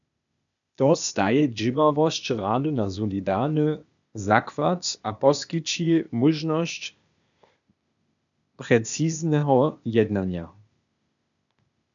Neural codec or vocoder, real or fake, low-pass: codec, 16 kHz, 0.8 kbps, ZipCodec; fake; 7.2 kHz